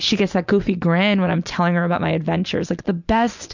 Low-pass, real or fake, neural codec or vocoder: 7.2 kHz; real; none